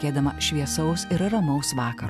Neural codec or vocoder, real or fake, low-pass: none; real; 14.4 kHz